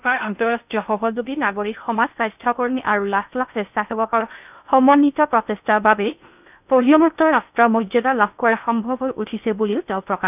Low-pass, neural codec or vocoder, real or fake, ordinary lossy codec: 3.6 kHz; codec, 16 kHz in and 24 kHz out, 0.6 kbps, FocalCodec, streaming, 2048 codes; fake; none